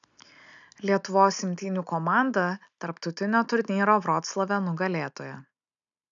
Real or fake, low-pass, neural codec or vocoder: real; 7.2 kHz; none